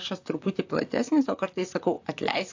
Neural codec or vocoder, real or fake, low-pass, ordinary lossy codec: vocoder, 44.1 kHz, 128 mel bands, Pupu-Vocoder; fake; 7.2 kHz; AAC, 48 kbps